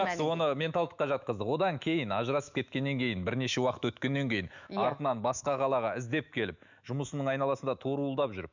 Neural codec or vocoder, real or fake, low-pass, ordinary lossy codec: autoencoder, 48 kHz, 128 numbers a frame, DAC-VAE, trained on Japanese speech; fake; 7.2 kHz; none